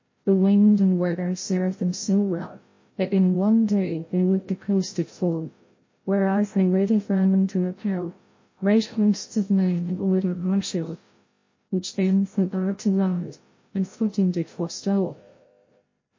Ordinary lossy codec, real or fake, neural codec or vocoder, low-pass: MP3, 32 kbps; fake; codec, 16 kHz, 0.5 kbps, FreqCodec, larger model; 7.2 kHz